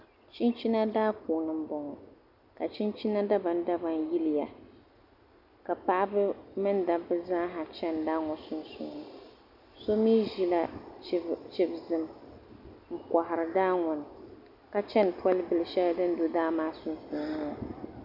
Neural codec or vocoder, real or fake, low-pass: none; real; 5.4 kHz